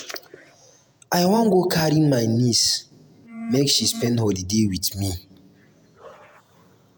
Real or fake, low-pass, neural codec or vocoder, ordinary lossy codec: fake; none; vocoder, 48 kHz, 128 mel bands, Vocos; none